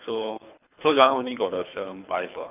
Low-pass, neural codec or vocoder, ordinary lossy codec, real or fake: 3.6 kHz; codec, 24 kHz, 3 kbps, HILCodec; none; fake